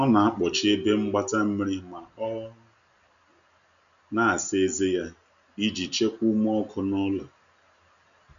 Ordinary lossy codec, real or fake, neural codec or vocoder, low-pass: none; real; none; 7.2 kHz